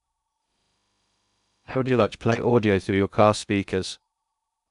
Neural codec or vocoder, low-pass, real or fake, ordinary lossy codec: codec, 16 kHz in and 24 kHz out, 0.6 kbps, FocalCodec, streaming, 2048 codes; 10.8 kHz; fake; none